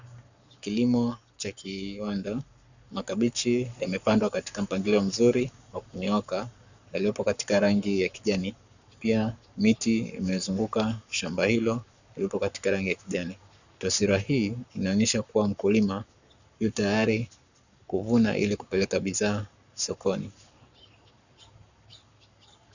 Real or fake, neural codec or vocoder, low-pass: fake; codec, 44.1 kHz, 7.8 kbps, Pupu-Codec; 7.2 kHz